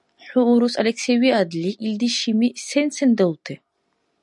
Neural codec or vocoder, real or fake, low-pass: none; real; 10.8 kHz